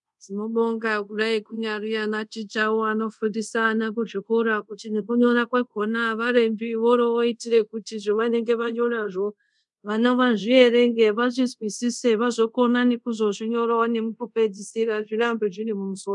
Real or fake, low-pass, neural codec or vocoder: fake; 10.8 kHz; codec, 24 kHz, 0.5 kbps, DualCodec